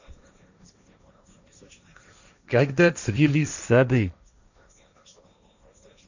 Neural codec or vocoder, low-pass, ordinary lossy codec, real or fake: codec, 16 kHz, 1.1 kbps, Voila-Tokenizer; 7.2 kHz; none; fake